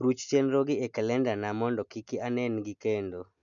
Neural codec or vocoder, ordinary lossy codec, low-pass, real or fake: none; none; 7.2 kHz; real